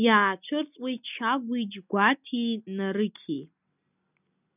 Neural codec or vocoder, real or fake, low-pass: none; real; 3.6 kHz